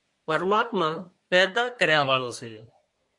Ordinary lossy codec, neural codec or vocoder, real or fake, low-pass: MP3, 48 kbps; codec, 24 kHz, 1 kbps, SNAC; fake; 10.8 kHz